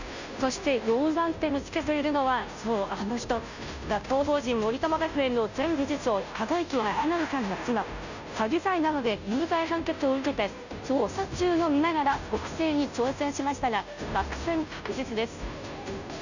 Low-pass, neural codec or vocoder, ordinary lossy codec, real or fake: 7.2 kHz; codec, 16 kHz, 0.5 kbps, FunCodec, trained on Chinese and English, 25 frames a second; none; fake